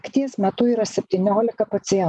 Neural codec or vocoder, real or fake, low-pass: none; real; 10.8 kHz